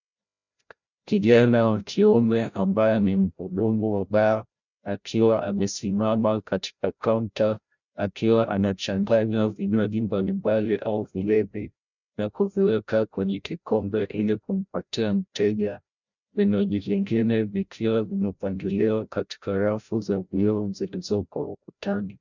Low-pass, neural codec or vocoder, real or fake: 7.2 kHz; codec, 16 kHz, 0.5 kbps, FreqCodec, larger model; fake